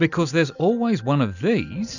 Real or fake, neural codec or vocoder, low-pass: real; none; 7.2 kHz